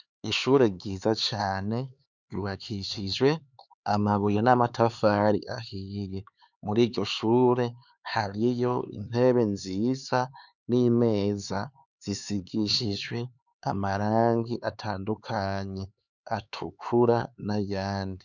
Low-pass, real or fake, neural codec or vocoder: 7.2 kHz; fake; codec, 16 kHz, 4 kbps, X-Codec, HuBERT features, trained on LibriSpeech